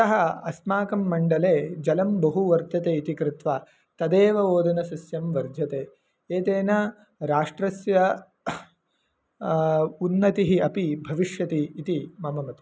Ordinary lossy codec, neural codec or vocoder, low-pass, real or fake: none; none; none; real